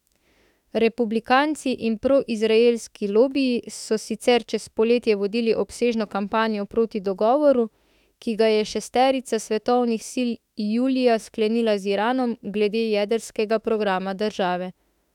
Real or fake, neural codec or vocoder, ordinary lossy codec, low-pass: fake; autoencoder, 48 kHz, 32 numbers a frame, DAC-VAE, trained on Japanese speech; none; 19.8 kHz